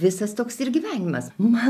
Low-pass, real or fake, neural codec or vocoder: 14.4 kHz; real; none